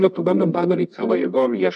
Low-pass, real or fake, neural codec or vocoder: 10.8 kHz; fake; codec, 24 kHz, 0.9 kbps, WavTokenizer, medium music audio release